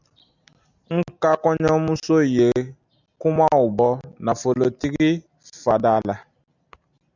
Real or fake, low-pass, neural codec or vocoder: real; 7.2 kHz; none